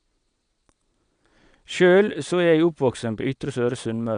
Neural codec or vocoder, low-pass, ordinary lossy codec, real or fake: vocoder, 22.05 kHz, 80 mel bands, Vocos; 9.9 kHz; none; fake